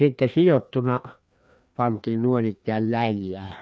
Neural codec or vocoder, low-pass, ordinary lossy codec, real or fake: codec, 16 kHz, 2 kbps, FreqCodec, larger model; none; none; fake